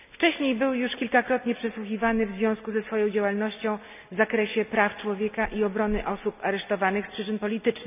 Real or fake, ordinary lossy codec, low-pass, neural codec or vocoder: real; none; 3.6 kHz; none